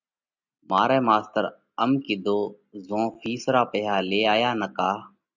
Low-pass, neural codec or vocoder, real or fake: 7.2 kHz; none; real